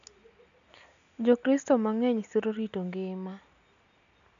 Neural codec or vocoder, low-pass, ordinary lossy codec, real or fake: none; 7.2 kHz; none; real